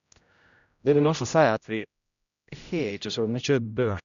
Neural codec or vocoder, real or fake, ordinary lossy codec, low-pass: codec, 16 kHz, 0.5 kbps, X-Codec, HuBERT features, trained on general audio; fake; none; 7.2 kHz